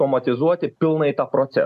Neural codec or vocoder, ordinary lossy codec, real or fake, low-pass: none; MP3, 96 kbps; real; 14.4 kHz